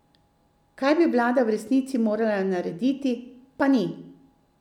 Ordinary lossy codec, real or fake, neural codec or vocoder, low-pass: none; real; none; 19.8 kHz